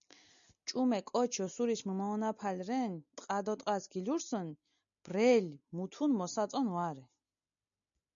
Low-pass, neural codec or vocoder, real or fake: 7.2 kHz; none; real